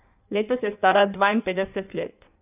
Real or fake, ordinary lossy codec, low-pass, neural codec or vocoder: fake; none; 3.6 kHz; codec, 16 kHz in and 24 kHz out, 1.1 kbps, FireRedTTS-2 codec